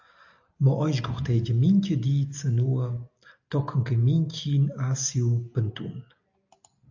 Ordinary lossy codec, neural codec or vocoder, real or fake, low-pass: AAC, 48 kbps; none; real; 7.2 kHz